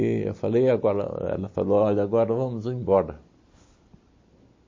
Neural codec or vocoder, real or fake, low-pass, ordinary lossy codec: none; real; 7.2 kHz; MP3, 32 kbps